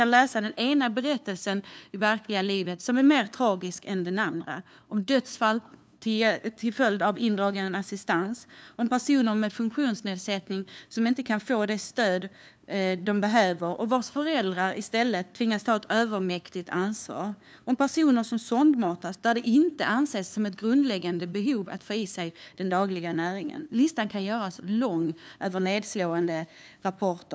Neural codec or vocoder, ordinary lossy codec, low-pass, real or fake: codec, 16 kHz, 2 kbps, FunCodec, trained on LibriTTS, 25 frames a second; none; none; fake